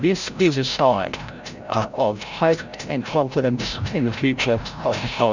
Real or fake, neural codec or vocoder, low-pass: fake; codec, 16 kHz, 0.5 kbps, FreqCodec, larger model; 7.2 kHz